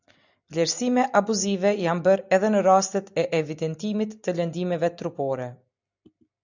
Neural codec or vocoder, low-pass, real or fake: none; 7.2 kHz; real